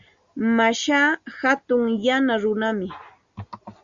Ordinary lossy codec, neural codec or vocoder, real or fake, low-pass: Opus, 64 kbps; none; real; 7.2 kHz